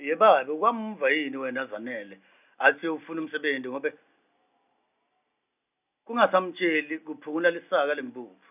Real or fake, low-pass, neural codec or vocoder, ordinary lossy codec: real; 3.6 kHz; none; none